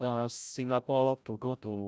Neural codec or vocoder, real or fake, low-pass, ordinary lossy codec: codec, 16 kHz, 0.5 kbps, FreqCodec, larger model; fake; none; none